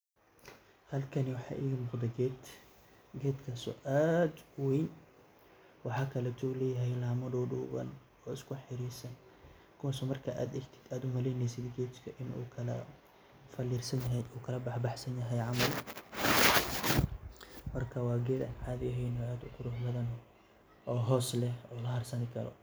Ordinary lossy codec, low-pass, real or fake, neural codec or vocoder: none; none; real; none